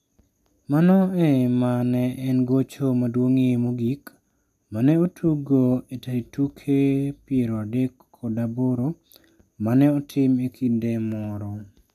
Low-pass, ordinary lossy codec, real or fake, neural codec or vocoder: 14.4 kHz; MP3, 96 kbps; real; none